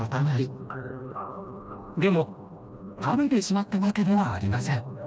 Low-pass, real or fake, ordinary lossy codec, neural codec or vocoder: none; fake; none; codec, 16 kHz, 1 kbps, FreqCodec, smaller model